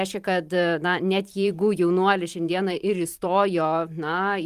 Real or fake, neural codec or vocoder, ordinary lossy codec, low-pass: fake; vocoder, 44.1 kHz, 128 mel bands every 512 samples, BigVGAN v2; Opus, 32 kbps; 14.4 kHz